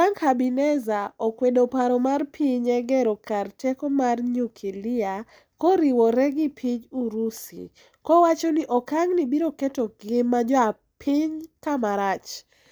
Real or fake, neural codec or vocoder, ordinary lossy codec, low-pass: real; none; none; none